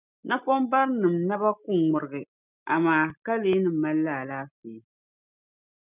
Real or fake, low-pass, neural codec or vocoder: real; 3.6 kHz; none